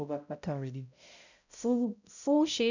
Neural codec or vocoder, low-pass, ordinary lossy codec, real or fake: codec, 16 kHz, 0.5 kbps, X-Codec, HuBERT features, trained on balanced general audio; 7.2 kHz; none; fake